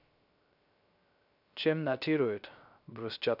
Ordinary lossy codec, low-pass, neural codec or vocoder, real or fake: none; 5.4 kHz; codec, 16 kHz, 0.3 kbps, FocalCodec; fake